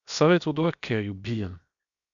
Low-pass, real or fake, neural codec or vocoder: 7.2 kHz; fake; codec, 16 kHz, 0.7 kbps, FocalCodec